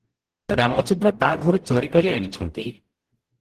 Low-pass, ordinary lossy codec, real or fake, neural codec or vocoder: 14.4 kHz; Opus, 16 kbps; fake; codec, 44.1 kHz, 0.9 kbps, DAC